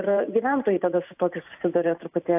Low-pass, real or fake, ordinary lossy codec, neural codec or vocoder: 3.6 kHz; fake; AAC, 32 kbps; vocoder, 44.1 kHz, 128 mel bands every 512 samples, BigVGAN v2